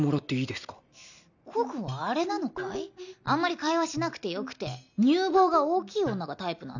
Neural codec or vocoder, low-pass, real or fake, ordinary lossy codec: none; 7.2 kHz; real; none